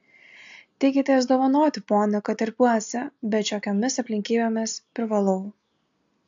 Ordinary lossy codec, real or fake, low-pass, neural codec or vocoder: AAC, 64 kbps; real; 7.2 kHz; none